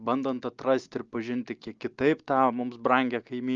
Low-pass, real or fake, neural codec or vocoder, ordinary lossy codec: 7.2 kHz; real; none; Opus, 24 kbps